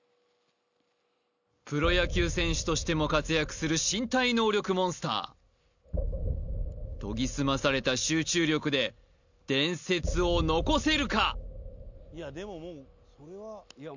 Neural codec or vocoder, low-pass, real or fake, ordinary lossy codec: none; 7.2 kHz; real; none